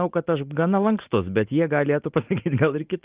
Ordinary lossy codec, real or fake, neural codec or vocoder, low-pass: Opus, 32 kbps; real; none; 3.6 kHz